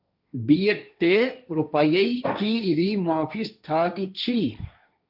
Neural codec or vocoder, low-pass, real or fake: codec, 16 kHz, 1.1 kbps, Voila-Tokenizer; 5.4 kHz; fake